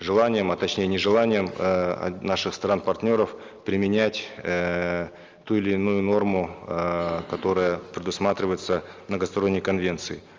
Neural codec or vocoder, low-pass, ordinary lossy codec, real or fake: none; 7.2 kHz; Opus, 32 kbps; real